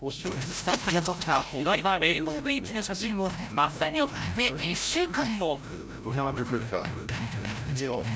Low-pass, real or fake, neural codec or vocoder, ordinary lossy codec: none; fake; codec, 16 kHz, 0.5 kbps, FreqCodec, larger model; none